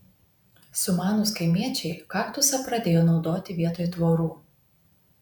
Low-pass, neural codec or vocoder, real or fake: 19.8 kHz; vocoder, 48 kHz, 128 mel bands, Vocos; fake